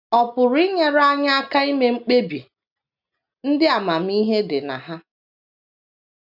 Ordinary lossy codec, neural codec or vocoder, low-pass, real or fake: none; none; 5.4 kHz; real